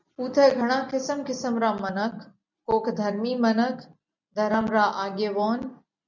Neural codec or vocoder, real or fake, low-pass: none; real; 7.2 kHz